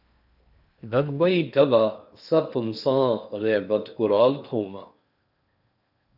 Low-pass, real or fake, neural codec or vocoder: 5.4 kHz; fake; codec, 16 kHz in and 24 kHz out, 0.8 kbps, FocalCodec, streaming, 65536 codes